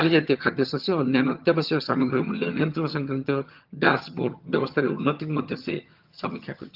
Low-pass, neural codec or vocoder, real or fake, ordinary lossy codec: 5.4 kHz; vocoder, 22.05 kHz, 80 mel bands, HiFi-GAN; fake; Opus, 32 kbps